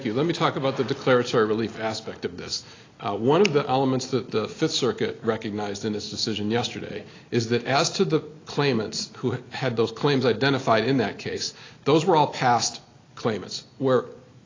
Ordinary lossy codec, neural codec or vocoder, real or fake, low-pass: AAC, 32 kbps; none; real; 7.2 kHz